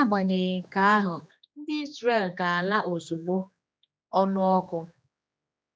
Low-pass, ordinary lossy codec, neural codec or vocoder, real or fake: none; none; codec, 16 kHz, 2 kbps, X-Codec, HuBERT features, trained on general audio; fake